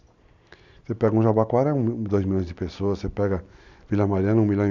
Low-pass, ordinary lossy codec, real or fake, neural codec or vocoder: 7.2 kHz; none; real; none